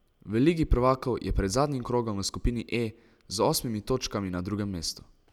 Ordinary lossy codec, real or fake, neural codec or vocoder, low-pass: none; real; none; 19.8 kHz